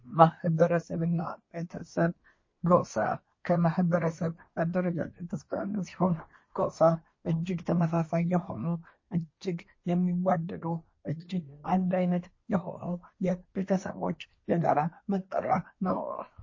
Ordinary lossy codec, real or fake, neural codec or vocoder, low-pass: MP3, 32 kbps; fake; codec, 24 kHz, 1 kbps, SNAC; 7.2 kHz